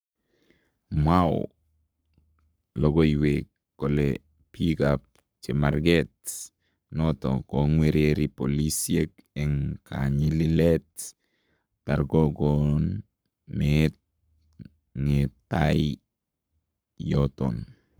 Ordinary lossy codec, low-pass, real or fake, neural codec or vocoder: none; none; fake; codec, 44.1 kHz, 7.8 kbps, Pupu-Codec